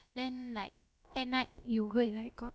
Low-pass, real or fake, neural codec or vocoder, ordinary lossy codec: none; fake; codec, 16 kHz, about 1 kbps, DyCAST, with the encoder's durations; none